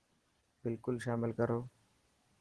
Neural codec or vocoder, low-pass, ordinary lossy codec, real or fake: none; 10.8 kHz; Opus, 16 kbps; real